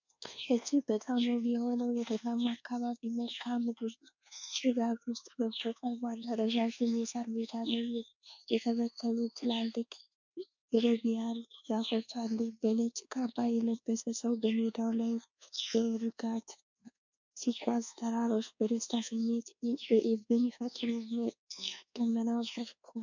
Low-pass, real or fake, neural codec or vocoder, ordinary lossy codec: 7.2 kHz; fake; codec, 24 kHz, 1.2 kbps, DualCodec; MP3, 64 kbps